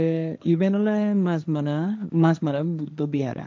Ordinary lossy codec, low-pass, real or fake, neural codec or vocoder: none; none; fake; codec, 16 kHz, 1.1 kbps, Voila-Tokenizer